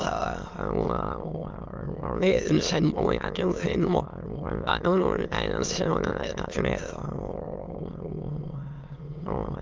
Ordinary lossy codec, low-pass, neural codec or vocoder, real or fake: Opus, 32 kbps; 7.2 kHz; autoencoder, 22.05 kHz, a latent of 192 numbers a frame, VITS, trained on many speakers; fake